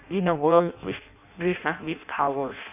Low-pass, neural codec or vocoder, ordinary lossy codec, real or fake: 3.6 kHz; codec, 16 kHz in and 24 kHz out, 0.6 kbps, FireRedTTS-2 codec; none; fake